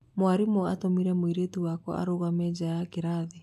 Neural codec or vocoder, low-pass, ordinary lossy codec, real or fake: none; 14.4 kHz; AAC, 96 kbps; real